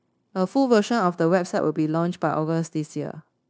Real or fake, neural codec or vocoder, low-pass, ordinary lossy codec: fake; codec, 16 kHz, 0.9 kbps, LongCat-Audio-Codec; none; none